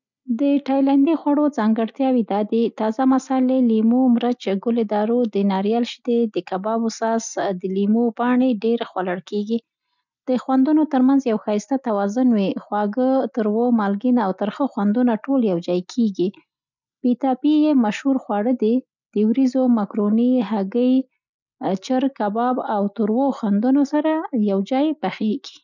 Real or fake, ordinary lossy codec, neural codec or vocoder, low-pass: real; none; none; 7.2 kHz